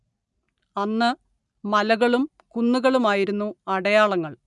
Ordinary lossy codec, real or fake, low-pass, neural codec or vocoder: none; real; 10.8 kHz; none